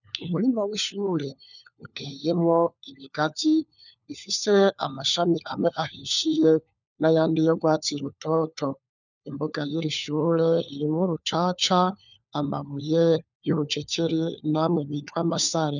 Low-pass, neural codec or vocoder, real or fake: 7.2 kHz; codec, 16 kHz, 4 kbps, FunCodec, trained on LibriTTS, 50 frames a second; fake